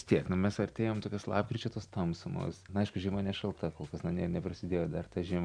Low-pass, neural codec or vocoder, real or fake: 9.9 kHz; none; real